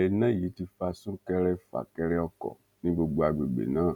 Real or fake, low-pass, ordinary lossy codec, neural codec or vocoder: real; 19.8 kHz; none; none